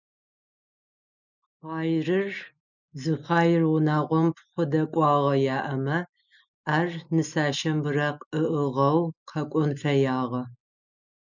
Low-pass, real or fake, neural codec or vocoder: 7.2 kHz; real; none